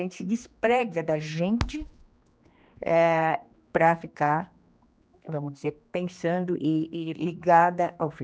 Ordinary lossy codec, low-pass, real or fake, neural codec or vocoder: none; none; fake; codec, 16 kHz, 2 kbps, X-Codec, HuBERT features, trained on general audio